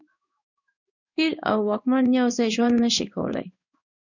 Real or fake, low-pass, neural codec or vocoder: fake; 7.2 kHz; codec, 16 kHz in and 24 kHz out, 1 kbps, XY-Tokenizer